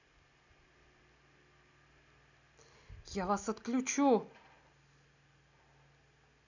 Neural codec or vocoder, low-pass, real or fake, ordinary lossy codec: none; 7.2 kHz; real; none